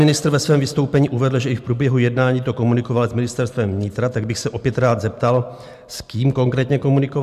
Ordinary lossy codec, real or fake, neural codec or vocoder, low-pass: MP3, 96 kbps; real; none; 14.4 kHz